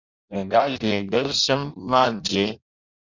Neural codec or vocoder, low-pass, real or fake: codec, 16 kHz in and 24 kHz out, 0.6 kbps, FireRedTTS-2 codec; 7.2 kHz; fake